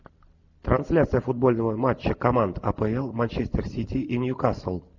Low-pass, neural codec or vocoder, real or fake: 7.2 kHz; none; real